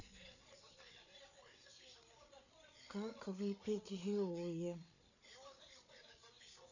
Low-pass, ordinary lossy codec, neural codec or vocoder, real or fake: 7.2 kHz; none; codec, 16 kHz, 8 kbps, FreqCodec, larger model; fake